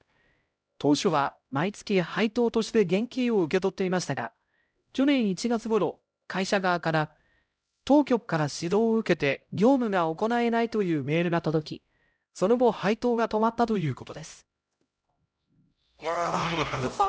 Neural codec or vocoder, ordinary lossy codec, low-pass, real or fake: codec, 16 kHz, 0.5 kbps, X-Codec, HuBERT features, trained on LibriSpeech; none; none; fake